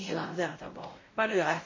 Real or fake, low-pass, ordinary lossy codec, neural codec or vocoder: fake; 7.2 kHz; MP3, 32 kbps; codec, 16 kHz, 0.5 kbps, X-Codec, WavLM features, trained on Multilingual LibriSpeech